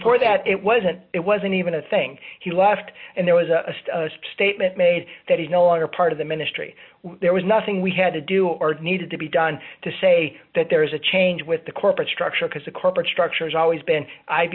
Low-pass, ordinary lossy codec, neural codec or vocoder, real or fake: 5.4 kHz; MP3, 32 kbps; none; real